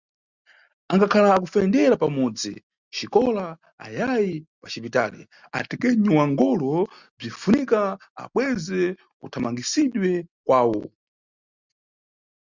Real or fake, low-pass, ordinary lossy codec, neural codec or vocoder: real; 7.2 kHz; Opus, 64 kbps; none